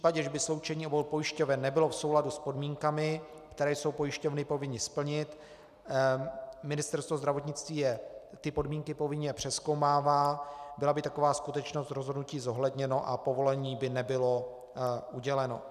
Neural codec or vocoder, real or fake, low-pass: none; real; 14.4 kHz